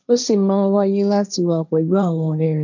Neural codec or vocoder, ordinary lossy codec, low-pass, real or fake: codec, 16 kHz, 1.1 kbps, Voila-Tokenizer; none; 7.2 kHz; fake